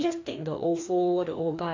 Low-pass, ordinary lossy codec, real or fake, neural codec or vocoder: 7.2 kHz; AAC, 48 kbps; fake; codec, 16 kHz, 2 kbps, FreqCodec, larger model